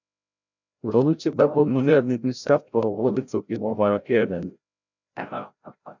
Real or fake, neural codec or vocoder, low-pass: fake; codec, 16 kHz, 0.5 kbps, FreqCodec, larger model; 7.2 kHz